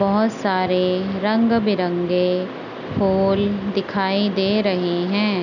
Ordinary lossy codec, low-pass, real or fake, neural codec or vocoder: none; 7.2 kHz; real; none